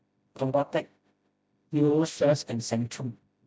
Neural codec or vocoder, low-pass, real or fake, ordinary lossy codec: codec, 16 kHz, 0.5 kbps, FreqCodec, smaller model; none; fake; none